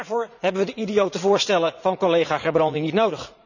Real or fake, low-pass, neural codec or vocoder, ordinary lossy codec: fake; 7.2 kHz; vocoder, 44.1 kHz, 80 mel bands, Vocos; none